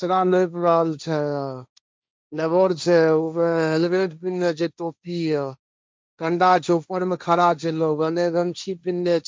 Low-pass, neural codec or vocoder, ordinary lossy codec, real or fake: none; codec, 16 kHz, 1.1 kbps, Voila-Tokenizer; none; fake